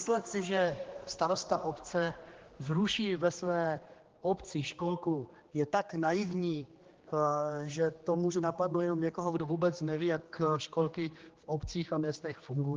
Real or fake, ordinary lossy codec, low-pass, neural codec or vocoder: fake; Opus, 16 kbps; 7.2 kHz; codec, 16 kHz, 2 kbps, X-Codec, HuBERT features, trained on general audio